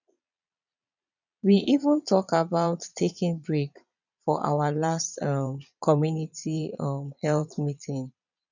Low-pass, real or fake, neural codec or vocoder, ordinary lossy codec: 7.2 kHz; fake; vocoder, 22.05 kHz, 80 mel bands, Vocos; none